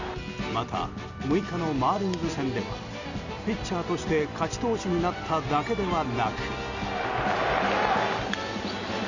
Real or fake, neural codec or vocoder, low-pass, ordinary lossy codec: real; none; 7.2 kHz; none